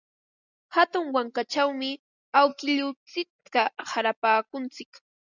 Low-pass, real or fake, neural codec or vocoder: 7.2 kHz; real; none